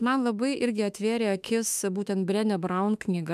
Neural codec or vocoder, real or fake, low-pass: autoencoder, 48 kHz, 32 numbers a frame, DAC-VAE, trained on Japanese speech; fake; 14.4 kHz